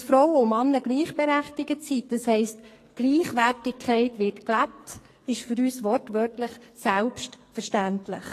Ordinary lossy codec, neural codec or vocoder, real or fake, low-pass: AAC, 48 kbps; codec, 44.1 kHz, 2.6 kbps, SNAC; fake; 14.4 kHz